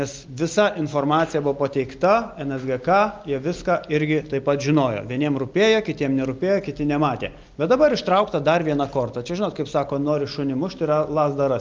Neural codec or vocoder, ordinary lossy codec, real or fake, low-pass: none; Opus, 24 kbps; real; 7.2 kHz